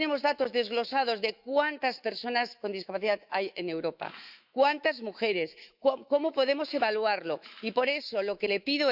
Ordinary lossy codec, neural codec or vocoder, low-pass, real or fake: Opus, 64 kbps; autoencoder, 48 kHz, 128 numbers a frame, DAC-VAE, trained on Japanese speech; 5.4 kHz; fake